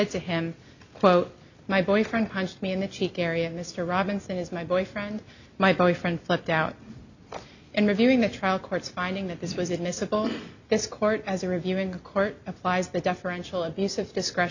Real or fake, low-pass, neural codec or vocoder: real; 7.2 kHz; none